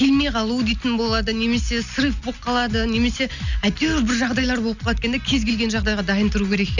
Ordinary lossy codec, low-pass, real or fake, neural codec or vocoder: none; 7.2 kHz; real; none